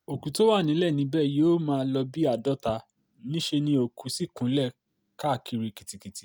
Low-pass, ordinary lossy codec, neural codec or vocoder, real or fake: none; none; none; real